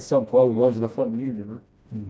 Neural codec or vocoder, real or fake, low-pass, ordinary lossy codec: codec, 16 kHz, 1 kbps, FreqCodec, smaller model; fake; none; none